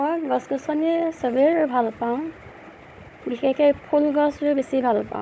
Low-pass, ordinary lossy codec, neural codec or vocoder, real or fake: none; none; codec, 16 kHz, 16 kbps, FunCodec, trained on Chinese and English, 50 frames a second; fake